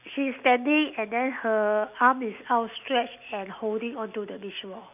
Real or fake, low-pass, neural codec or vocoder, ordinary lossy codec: real; 3.6 kHz; none; none